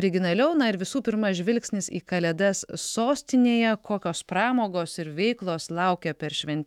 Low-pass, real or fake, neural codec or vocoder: 19.8 kHz; fake; autoencoder, 48 kHz, 128 numbers a frame, DAC-VAE, trained on Japanese speech